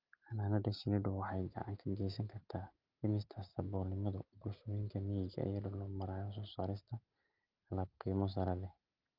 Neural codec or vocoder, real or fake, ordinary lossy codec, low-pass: none; real; Opus, 24 kbps; 5.4 kHz